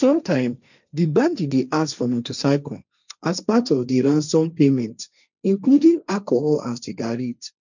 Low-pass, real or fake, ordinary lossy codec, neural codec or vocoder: 7.2 kHz; fake; none; codec, 16 kHz, 1.1 kbps, Voila-Tokenizer